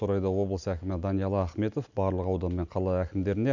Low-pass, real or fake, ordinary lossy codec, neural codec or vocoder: 7.2 kHz; real; none; none